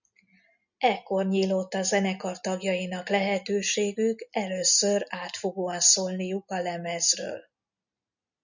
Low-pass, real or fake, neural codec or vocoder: 7.2 kHz; real; none